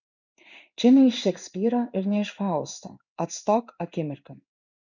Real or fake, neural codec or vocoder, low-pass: fake; codec, 16 kHz in and 24 kHz out, 1 kbps, XY-Tokenizer; 7.2 kHz